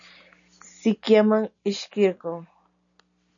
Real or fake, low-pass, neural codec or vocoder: real; 7.2 kHz; none